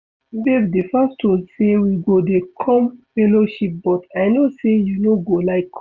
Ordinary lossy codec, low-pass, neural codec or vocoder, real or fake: Opus, 64 kbps; 7.2 kHz; none; real